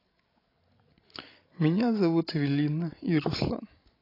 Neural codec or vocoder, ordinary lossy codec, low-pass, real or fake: codec, 16 kHz, 16 kbps, FreqCodec, larger model; AAC, 24 kbps; 5.4 kHz; fake